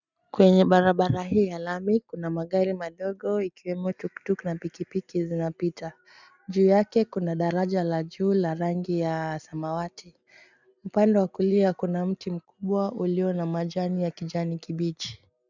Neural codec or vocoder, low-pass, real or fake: none; 7.2 kHz; real